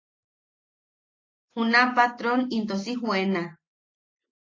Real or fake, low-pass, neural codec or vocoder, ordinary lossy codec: real; 7.2 kHz; none; AAC, 32 kbps